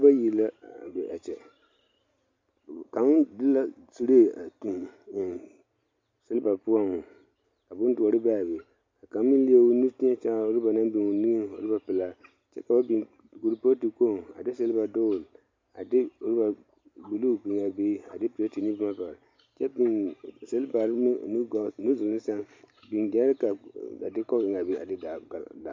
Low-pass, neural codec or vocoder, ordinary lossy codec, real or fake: 7.2 kHz; none; MP3, 48 kbps; real